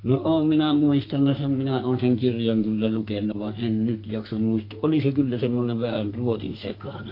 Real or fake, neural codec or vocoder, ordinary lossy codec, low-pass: fake; codec, 32 kHz, 1.9 kbps, SNAC; AAC, 32 kbps; 5.4 kHz